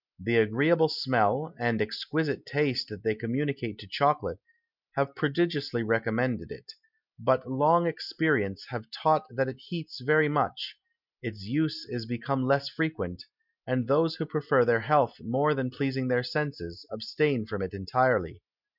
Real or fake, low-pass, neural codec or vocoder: real; 5.4 kHz; none